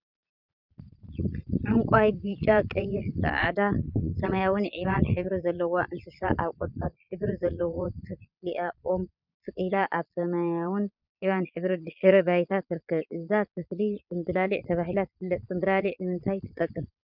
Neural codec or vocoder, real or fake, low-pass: vocoder, 22.05 kHz, 80 mel bands, Vocos; fake; 5.4 kHz